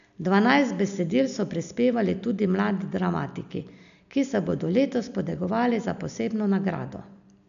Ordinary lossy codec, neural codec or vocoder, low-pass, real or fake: none; none; 7.2 kHz; real